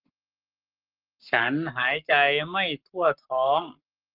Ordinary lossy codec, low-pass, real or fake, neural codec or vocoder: Opus, 24 kbps; 5.4 kHz; fake; codec, 44.1 kHz, 7.8 kbps, Pupu-Codec